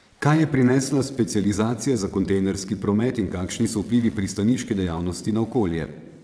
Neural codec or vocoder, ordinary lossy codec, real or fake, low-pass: vocoder, 22.05 kHz, 80 mel bands, WaveNeXt; none; fake; none